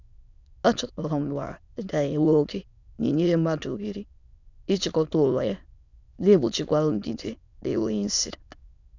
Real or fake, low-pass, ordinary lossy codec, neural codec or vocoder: fake; 7.2 kHz; none; autoencoder, 22.05 kHz, a latent of 192 numbers a frame, VITS, trained on many speakers